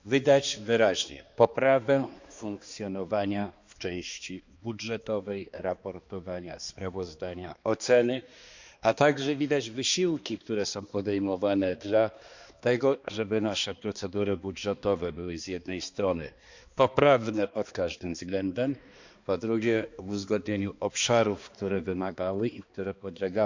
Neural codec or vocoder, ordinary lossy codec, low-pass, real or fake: codec, 16 kHz, 2 kbps, X-Codec, HuBERT features, trained on balanced general audio; Opus, 64 kbps; 7.2 kHz; fake